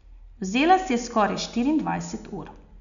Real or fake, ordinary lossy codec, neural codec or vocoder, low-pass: real; none; none; 7.2 kHz